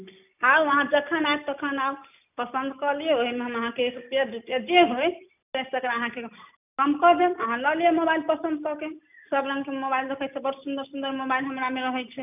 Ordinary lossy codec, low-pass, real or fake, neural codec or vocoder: none; 3.6 kHz; real; none